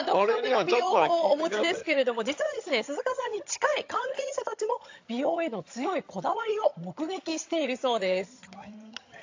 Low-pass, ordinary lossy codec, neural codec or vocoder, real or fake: 7.2 kHz; AAC, 48 kbps; vocoder, 22.05 kHz, 80 mel bands, HiFi-GAN; fake